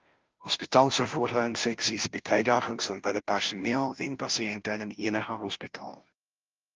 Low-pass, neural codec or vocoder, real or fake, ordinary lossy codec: 7.2 kHz; codec, 16 kHz, 0.5 kbps, FunCodec, trained on Chinese and English, 25 frames a second; fake; Opus, 24 kbps